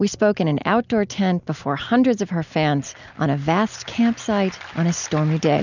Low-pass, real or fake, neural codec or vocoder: 7.2 kHz; real; none